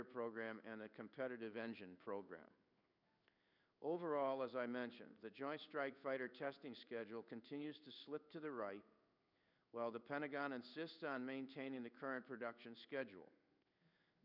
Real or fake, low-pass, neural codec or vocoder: fake; 5.4 kHz; codec, 16 kHz in and 24 kHz out, 1 kbps, XY-Tokenizer